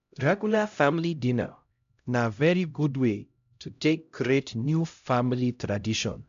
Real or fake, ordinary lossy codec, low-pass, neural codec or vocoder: fake; MP3, 64 kbps; 7.2 kHz; codec, 16 kHz, 0.5 kbps, X-Codec, HuBERT features, trained on LibriSpeech